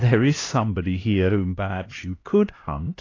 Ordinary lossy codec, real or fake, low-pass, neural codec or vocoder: AAC, 32 kbps; fake; 7.2 kHz; codec, 16 kHz, 1 kbps, X-Codec, HuBERT features, trained on LibriSpeech